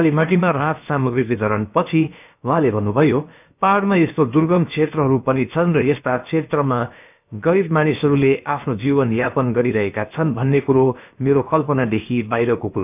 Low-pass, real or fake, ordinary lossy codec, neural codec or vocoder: 3.6 kHz; fake; none; codec, 16 kHz, about 1 kbps, DyCAST, with the encoder's durations